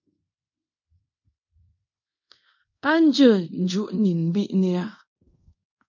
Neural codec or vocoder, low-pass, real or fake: codec, 24 kHz, 0.5 kbps, DualCodec; 7.2 kHz; fake